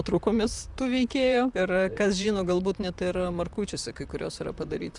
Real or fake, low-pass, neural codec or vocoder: fake; 10.8 kHz; vocoder, 44.1 kHz, 128 mel bands, Pupu-Vocoder